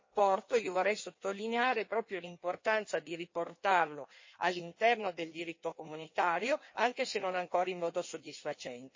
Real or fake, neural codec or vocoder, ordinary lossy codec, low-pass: fake; codec, 16 kHz in and 24 kHz out, 1.1 kbps, FireRedTTS-2 codec; MP3, 32 kbps; 7.2 kHz